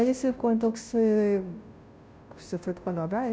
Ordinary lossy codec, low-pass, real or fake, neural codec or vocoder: none; none; fake; codec, 16 kHz, 0.5 kbps, FunCodec, trained on Chinese and English, 25 frames a second